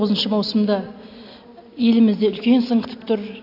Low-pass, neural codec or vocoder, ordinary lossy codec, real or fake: 5.4 kHz; none; AAC, 32 kbps; real